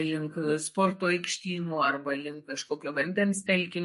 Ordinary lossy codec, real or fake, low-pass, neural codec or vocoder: MP3, 48 kbps; fake; 14.4 kHz; codec, 32 kHz, 1.9 kbps, SNAC